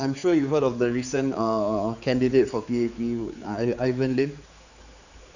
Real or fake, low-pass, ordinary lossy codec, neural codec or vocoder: fake; 7.2 kHz; none; codec, 16 kHz, 4 kbps, X-Codec, HuBERT features, trained on general audio